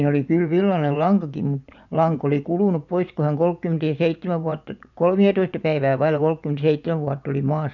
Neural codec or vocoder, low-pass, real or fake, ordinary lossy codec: vocoder, 24 kHz, 100 mel bands, Vocos; 7.2 kHz; fake; none